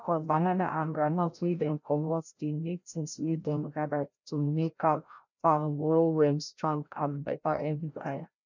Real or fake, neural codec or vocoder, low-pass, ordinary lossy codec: fake; codec, 16 kHz, 0.5 kbps, FreqCodec, larger model; 7.2 kHz; none